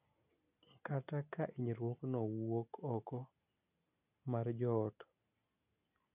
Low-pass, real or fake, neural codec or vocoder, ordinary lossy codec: 3.6 kHz; real; none; none